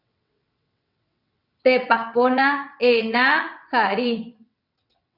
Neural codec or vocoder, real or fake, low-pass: vocoder, 22.05 kHz, 80 mel bands, WaveNeXt; fake; 5.4 kHz